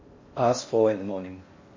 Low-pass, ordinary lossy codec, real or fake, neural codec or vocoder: 7.2 kHz; MP3, 32 kbps; fake; codec, 16 kHz in and 24 kHz out, 0.6 kbps, FocalCodec, streaming, 4096 codes